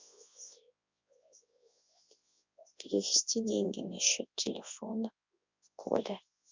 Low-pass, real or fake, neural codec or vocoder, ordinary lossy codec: 7.2 kHz; fake; codec, 24 kHz, 0.9 kbps, WavTokenizer, large speech release; MP3, 64 kbps